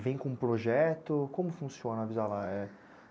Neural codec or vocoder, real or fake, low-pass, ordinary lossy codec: none; real; none; none